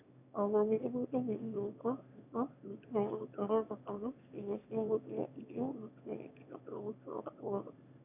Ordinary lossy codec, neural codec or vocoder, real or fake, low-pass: none; autoencoder, 22.05 kHz, a latent of 192 numbers a frame, VITS, trained on one speaker; fake; 3.6 kHz